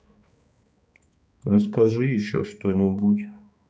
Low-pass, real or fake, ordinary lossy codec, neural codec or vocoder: none; fake; none; codec, 16 kHz, 2 kbps, X-Codec, HuBERT features, trained on balanced general audio